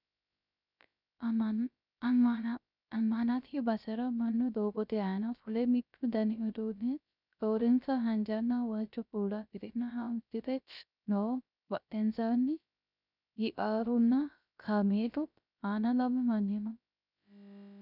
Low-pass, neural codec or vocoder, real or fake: 5.4 kHz; codec, 16 kHz, 0.3 kbps, FocalCodec; fake